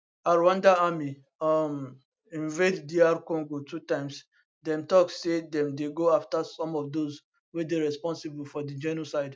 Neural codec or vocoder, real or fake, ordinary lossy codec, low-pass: none; real; none; none